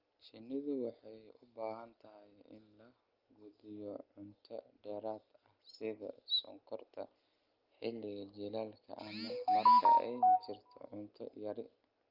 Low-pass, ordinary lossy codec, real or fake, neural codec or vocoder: 5.4 kHz; Opus, 24 kbps; real; none